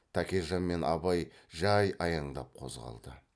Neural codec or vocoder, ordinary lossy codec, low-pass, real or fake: none; none; none; real